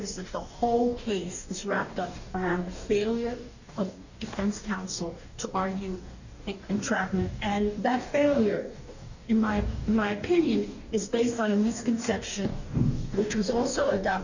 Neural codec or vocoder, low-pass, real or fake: codec, 44.1 kHz, 2.6 kbps, DAC; 7.2 kHz; fake